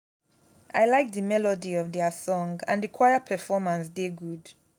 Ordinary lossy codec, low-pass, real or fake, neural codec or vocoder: none; 19.8 kHz; real; none